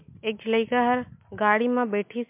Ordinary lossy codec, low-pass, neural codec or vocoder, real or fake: MP3, 32 kbps; 3.6 kHz; none; real